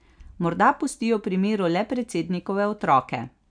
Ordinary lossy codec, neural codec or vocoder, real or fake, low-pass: none; none; real; 9.9 kHz